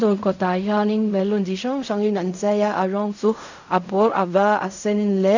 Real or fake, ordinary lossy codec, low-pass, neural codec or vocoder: fake; none; 7.2 kHz; codec, 16 kHz in and 24 kHz out, 0.4 kbps, LongCat-Audio-Codec, fine tuned four codebook decoder